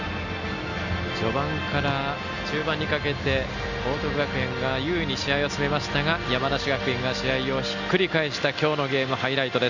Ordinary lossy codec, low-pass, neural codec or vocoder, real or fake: none; 7.2 kHz; none; real